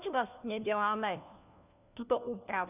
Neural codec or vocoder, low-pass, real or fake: codec, 16 kHz, 1 kbps, FunCodec, trained on LibriTTS, 50 frames a second; 3.6 kHz; fake